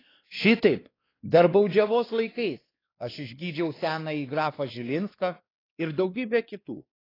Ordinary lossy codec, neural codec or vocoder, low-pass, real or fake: AAC, 24 kbps; codec, 16 kHz, 2 kbps, X-Codec, WavLM features, trained on Multilingual LibriSpeech; 5.4 kHz; fake